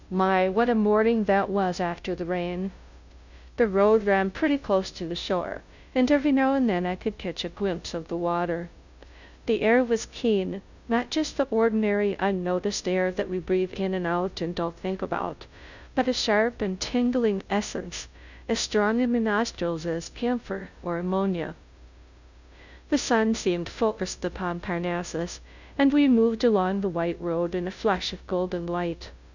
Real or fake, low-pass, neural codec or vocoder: fake; 7.2 kHz; codec, 16 kHz, 0.5 kbps, FunCodec, trained on Chinese and English, 25 frames a second